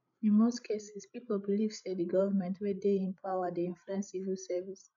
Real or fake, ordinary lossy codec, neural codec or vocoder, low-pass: fake; none; codec, 16 kHz, 16 kbps, FreqCodec, larger model; 7.2 kHz